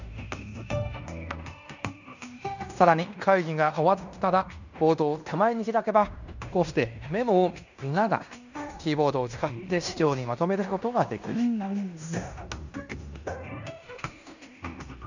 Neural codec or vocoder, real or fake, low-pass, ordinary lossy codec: codec, 16 kHz in and 24 kHz out, 0.9 kbps, LongCat-Audio-Codec, fine tuned four codebook decoder; fake; 7.2 kHz; none